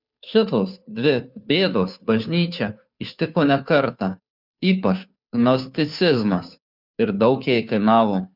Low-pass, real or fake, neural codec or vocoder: 5.4 kHz; fake; codec, 16 kHz, 2 kbps, FunCodec, trained on Chinese and English, 25 frames a second